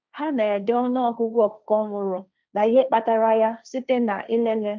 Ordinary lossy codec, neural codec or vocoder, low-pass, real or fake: none; codec, 16 kHz, 1.1 kbps, Voila-Tokenizer; none; fake